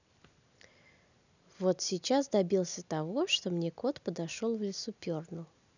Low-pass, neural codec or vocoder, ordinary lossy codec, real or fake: 7.2 kHz; none; none; real